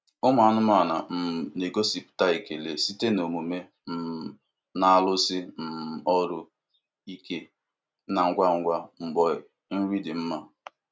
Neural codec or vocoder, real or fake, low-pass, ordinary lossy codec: none; real; none; none